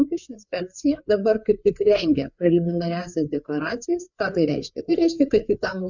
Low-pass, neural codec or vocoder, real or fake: 7.2 kHz; codec, 16 kHz, 4 kbps, FreqCodec, larger model; fake